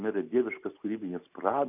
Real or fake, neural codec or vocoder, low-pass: real; none; 3.6 kHz